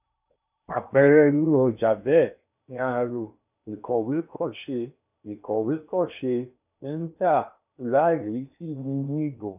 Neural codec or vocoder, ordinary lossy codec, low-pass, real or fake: codec, 16 kHz in and 24 kHz out, 0.8 kbps, FocalCodec, streaming, 65536 codes; none; 3.6 kHz; fake